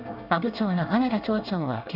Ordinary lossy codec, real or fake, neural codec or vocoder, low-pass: none; fake; codec, 24 kHz, 1 kbps, SNAC; 5.4 kHz